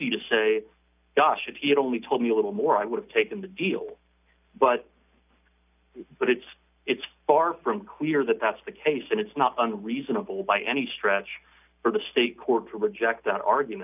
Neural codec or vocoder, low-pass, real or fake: none; 3.6 kHz; real